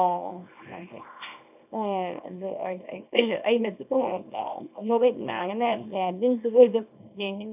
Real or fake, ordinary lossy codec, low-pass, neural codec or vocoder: fake; none; 3.6 kHz; codec, 24 kHz, 0.9 kbps, WavTokenizer, small release